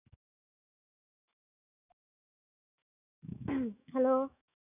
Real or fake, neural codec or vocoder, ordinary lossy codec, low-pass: fake; autoencoder, 48 kHz, 128 numbers a frame, DAC-VAE, trained on Japanese speech; none; 3.6 kHz